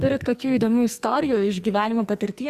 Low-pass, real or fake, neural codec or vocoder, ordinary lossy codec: 14.4 kHz; fake; codec, 44.1 kHz, 2.6 kbps, SNAC; Opus, 64 kbps